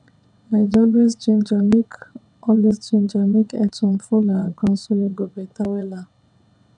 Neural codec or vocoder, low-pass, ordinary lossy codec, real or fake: vocoder, 22.05 kHz, 80 mel bands, WaveNeXt; 9.9 kHz; none; fake